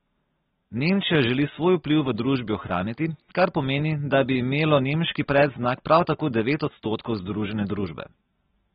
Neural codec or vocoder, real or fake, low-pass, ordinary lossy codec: none; real; 14.4 kHz; AAC, 16 kbps